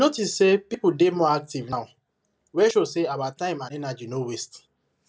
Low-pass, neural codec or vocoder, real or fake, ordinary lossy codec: none; none; real; none